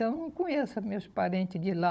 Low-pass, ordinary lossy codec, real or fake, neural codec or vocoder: none; none; fake; codec, 16 kHz, 16 kbps, FunCodec, trained on Chinese and English, 50 frames a second